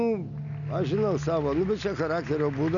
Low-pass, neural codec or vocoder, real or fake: 7.2 kHz; none; real